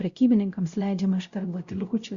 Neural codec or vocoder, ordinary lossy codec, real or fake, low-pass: codec, 16 kHz, 0.5 kbps, X-Codec, WavLM features, trained on Multilingual LibriSpeech; Opus, 64 kbps; fake; 7.2 kHz